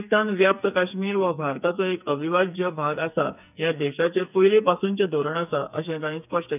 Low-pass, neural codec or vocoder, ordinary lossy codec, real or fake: 3.6 kHz; codec, 44.1 kHz, 2.6 kbps, SNAC; none; fake